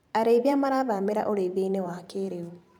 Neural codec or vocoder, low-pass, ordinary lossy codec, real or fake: vocoder, 44.1 kHz, 128 mel bands every 512 samples, BigVGAN v2; 19.8 kHz; none; fake